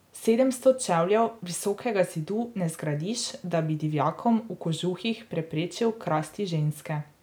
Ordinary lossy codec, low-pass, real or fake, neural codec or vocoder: none; none; real; none